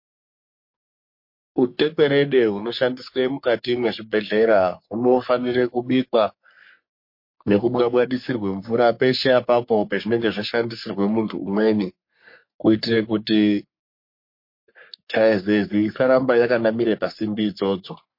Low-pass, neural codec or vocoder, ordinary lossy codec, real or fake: 5.4 kHz; codec, 44.1 kHz, 3.4 kbps, Pupu-Codec; MP3, 32 kbps; fake